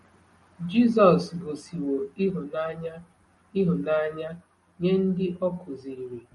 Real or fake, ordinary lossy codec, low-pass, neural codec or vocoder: real; MP3, 48 kbps; 19.8 kHz; none